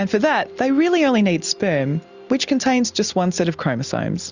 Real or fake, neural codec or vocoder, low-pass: real; none; 7.2 kHz